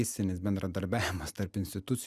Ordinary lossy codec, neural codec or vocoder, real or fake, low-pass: Opus, 64 kbps; none; real; 14.4 kHz